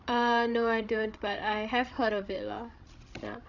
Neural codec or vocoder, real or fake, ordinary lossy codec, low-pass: codec, 16 kHz, 16 kbps, FreqCodec, larger model; fake; none; 7.2 kHz